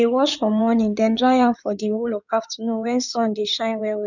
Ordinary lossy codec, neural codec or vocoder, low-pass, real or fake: none; codec, 16 kHz in and 24 kHz out, 2.2 kbps, FireRedTTS-2 codec; 7.2 kHz; fake